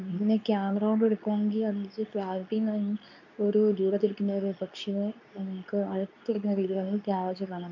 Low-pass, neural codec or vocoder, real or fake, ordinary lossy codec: 7.2 kHz; codec, 24 kHz, 0.9 kbps, WavTokenizer, medium speech release version 2; fake; none